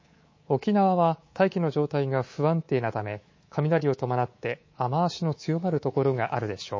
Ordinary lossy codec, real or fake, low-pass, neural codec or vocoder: MP3, 32 kbps; fake; 7.2 kHz; codec, 24 kHz, 3.1 kbps, DualCodec